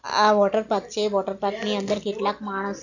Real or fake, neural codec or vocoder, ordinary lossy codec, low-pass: real; none; none; 7.2 kHz